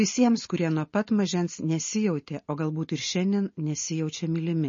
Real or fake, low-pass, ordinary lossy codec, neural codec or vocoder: real; 7.2 kHz; MP3, 32 kbps; none